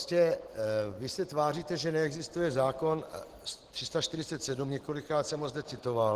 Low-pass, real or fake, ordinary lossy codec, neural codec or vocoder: 14.4 kHz; real; Opus, 16 kbps; none